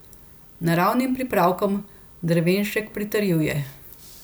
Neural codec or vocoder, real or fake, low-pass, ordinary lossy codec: none; real; none; none